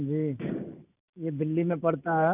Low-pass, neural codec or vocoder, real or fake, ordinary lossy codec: 3.6 kHz; none; real; none